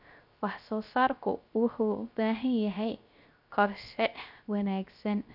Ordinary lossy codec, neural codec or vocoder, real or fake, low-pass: none; codec, 16 kHz, 0.3 kbps, FocalCodec; fake; 5.4 kHz